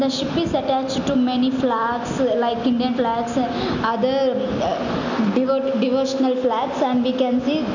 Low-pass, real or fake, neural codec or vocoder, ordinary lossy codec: 7.2 kHz; real; none; none